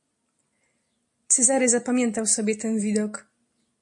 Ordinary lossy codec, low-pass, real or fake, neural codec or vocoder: MP3, 48 kbps; 10.8 kHz; real; none